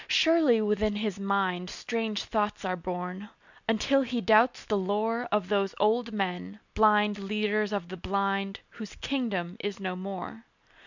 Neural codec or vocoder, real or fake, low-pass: none; real; 7.2 kHz